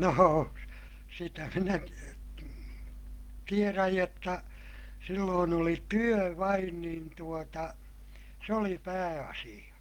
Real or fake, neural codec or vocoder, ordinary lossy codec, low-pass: real; none; Opus, 32 kbps; 19.8 kHz